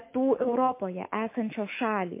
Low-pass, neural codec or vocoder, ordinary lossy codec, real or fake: 3.6 kHz; autoencoder, 48 kHz, 128 numbers a frame, DAC-VAE, trained on Japanese speech; MP3, 24 kbps; fake